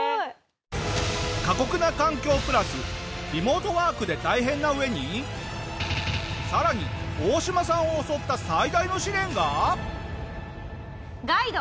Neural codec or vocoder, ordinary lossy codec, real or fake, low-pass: none; none; real; none